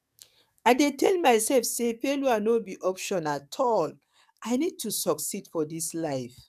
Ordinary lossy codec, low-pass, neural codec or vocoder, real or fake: none; 14.4 kHz; codec, 44.1 kHz, 7.8 kbps, DAC; fake